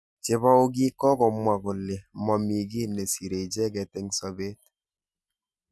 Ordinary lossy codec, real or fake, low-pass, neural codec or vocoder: none; real; none; none